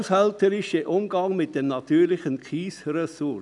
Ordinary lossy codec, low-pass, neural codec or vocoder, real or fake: none; 10.8 kHz; none; real